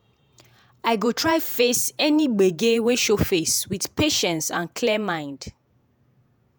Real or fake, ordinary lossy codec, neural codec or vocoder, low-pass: fake; none; vocoder, 48 kHz, 128 mel bands, Vocos; none